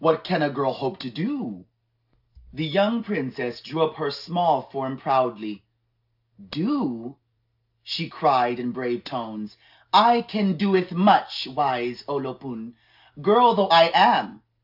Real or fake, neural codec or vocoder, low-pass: real; none; 5.4 kHz